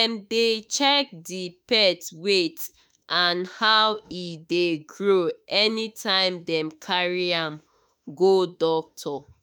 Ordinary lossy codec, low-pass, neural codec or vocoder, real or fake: none; none; autoencoder, 48 kHz, 32 numbers a frame, DAC-VAE, trained on Japanese speech; fake